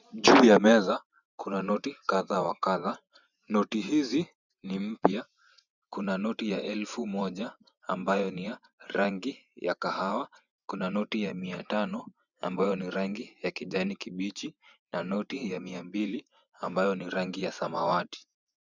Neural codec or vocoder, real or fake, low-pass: vocoder, 24 kHz, 100 mel bands, Vocos; fake; 7.2 kHz